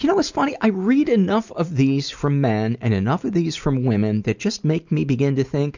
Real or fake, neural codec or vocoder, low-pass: real; none; 7.2 kHz